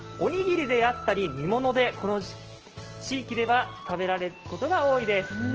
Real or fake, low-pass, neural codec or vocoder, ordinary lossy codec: real; 7.2 kHz; none; Opus, 16 kbps